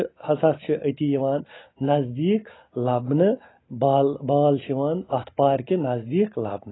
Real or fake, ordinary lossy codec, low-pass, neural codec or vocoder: real; AAC, 16 kbps; 7.2 kHz; none